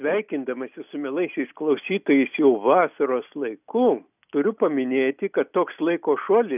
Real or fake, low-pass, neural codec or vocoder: real; 3.6 kHz; none